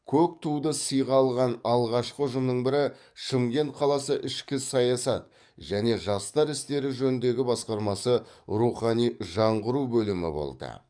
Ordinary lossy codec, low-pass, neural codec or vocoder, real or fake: none; 9.9 kHz; codec, 44.1 kHz, 7.8 kbps, DAC; fake